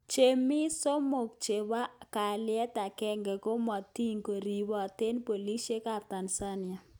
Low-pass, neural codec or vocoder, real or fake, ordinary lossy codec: none; none; real; none